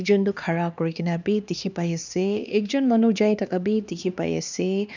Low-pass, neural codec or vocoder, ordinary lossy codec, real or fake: 7.2 kHz; codec, 16 kHz, 2 kbps, X-Codec, HuBERT features, trained on LibriSpeech; none; fake